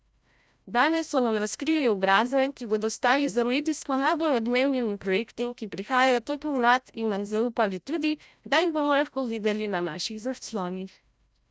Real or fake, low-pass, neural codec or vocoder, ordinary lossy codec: fake; none; codec, 16 kHz, 0.5 kbps, FreqCodec, larger model; none